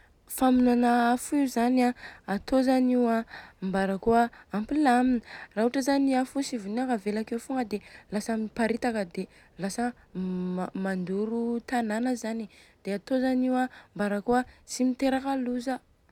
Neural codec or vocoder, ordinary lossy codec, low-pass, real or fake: none; none; 19.8 kHz; real